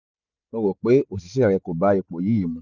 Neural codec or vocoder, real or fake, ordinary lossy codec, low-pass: none; real; none; 7.2 kHz